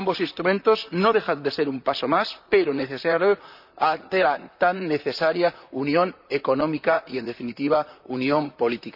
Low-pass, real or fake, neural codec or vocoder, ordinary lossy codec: 5.4 kHz; fake; vocoder, 44.1 kHz, 128 mel bands, Pupu-Vocoder; none